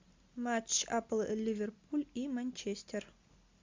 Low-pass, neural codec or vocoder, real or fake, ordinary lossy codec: 7.2 kHz; none; real; MP3, 64 kbps